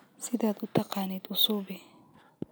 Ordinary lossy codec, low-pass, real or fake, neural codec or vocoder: none; none; real; none